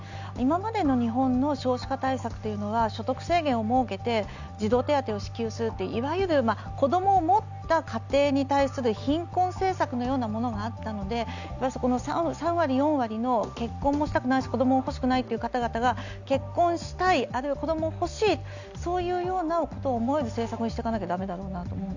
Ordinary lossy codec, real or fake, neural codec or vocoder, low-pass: none; real; none; 7.2 kHz